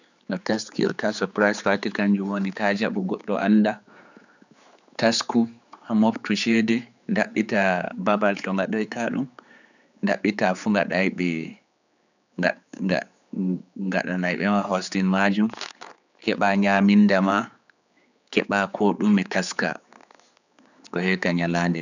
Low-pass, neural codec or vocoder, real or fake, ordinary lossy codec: 7.2 kHz; codec, 16 kHz, 4 kbps, X-Codec, HuBERT features, trained on general audio; fake; none